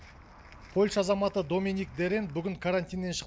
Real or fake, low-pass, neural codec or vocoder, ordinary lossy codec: real; none; none; none